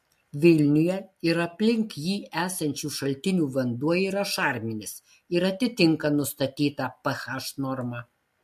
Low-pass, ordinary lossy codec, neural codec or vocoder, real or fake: 14.4 kHz; MP3, 64 kbps; none; real